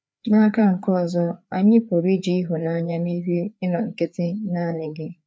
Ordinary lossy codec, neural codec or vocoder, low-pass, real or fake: none; codec, 16 kHz, 4 kbps, FreqCodec, larger model; none; fake